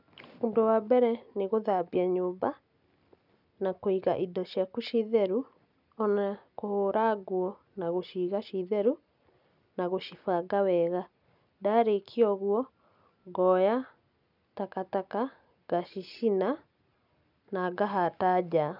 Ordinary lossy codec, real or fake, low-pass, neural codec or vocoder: none; real; 5.4 kHz; none